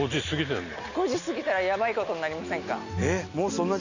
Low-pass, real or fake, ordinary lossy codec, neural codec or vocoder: 7.2 kHz; real; none; none